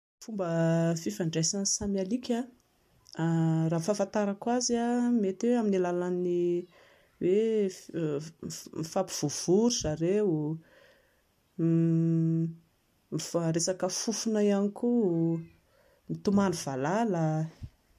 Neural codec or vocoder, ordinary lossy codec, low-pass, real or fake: none; MP3, 64 kbps; 14.4 kHz; real